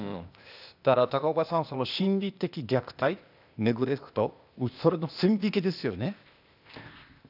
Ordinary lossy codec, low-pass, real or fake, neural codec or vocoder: none; 5.4 kHz; fake; codec, 16 kHz, 0.8 kbps, ZipCodec